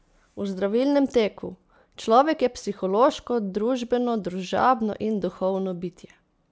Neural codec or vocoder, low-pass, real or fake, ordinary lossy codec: none; none; real; none